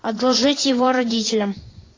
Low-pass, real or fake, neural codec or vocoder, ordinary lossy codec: 7.2 kHz; fake; vocoder, 24 kHz, 100 mel bands, Vocos; AAC, 32 kbps